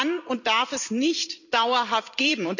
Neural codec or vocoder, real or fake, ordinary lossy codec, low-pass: vocoder, 44.1 kHz, 128 mel bands every 256 samples, BigVGAN v2; fake; none; 7.2 kHz